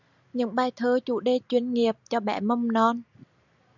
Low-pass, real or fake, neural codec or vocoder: 7.2 kHz; real; none